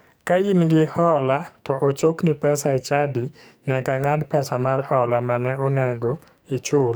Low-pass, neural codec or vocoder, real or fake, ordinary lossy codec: none; codec, 44.1 kHz, 2.6 kbps, SNAC; fake; none